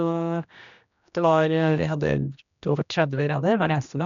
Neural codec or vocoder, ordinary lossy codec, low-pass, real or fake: codec, 16 kHz, 1 kbps, X-Codec, HuBERT features, trained on general audio; none; 7.2 kHz; fake